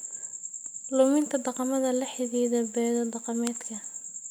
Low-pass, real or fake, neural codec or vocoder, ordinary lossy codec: none; real; none; none